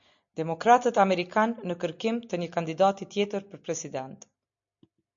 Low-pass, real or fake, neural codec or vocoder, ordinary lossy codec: 7.2 kHz; real; none; MP3, 48 kbps